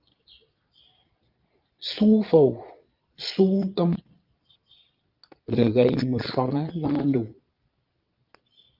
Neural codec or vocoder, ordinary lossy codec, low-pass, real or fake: vocoder, 22.05 kHz, 80 mel bands, Vocos; Opus, 24 kbps; 5.4 kHz; fake